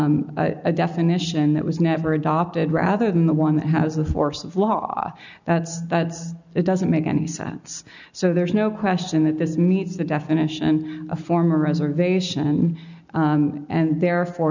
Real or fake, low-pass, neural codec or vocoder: real; 7.2 kHz; none